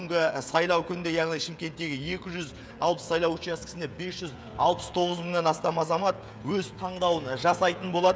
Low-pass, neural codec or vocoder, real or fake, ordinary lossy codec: none; codec, 16 kHz, 16 kbps, FreqCodec, smaller model; fake; none